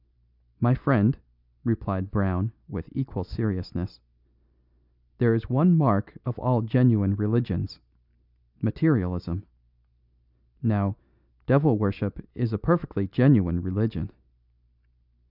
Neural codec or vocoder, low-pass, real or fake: none; 5.4 kHz; real